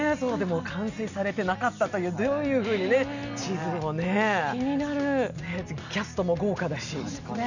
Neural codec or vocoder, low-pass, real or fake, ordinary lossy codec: none; 7.2 kHz; real; none